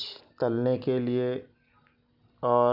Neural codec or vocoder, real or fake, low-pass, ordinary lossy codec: none; real; 5.4 kHz; none